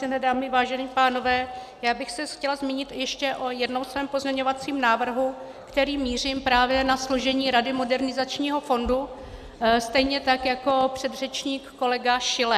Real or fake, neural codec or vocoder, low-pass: real; none; 14.4 kHz